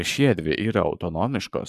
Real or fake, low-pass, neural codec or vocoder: fake; 14.4 kHz; codec, 44.1 kHz, 7.8 kbps, Pupu-Codec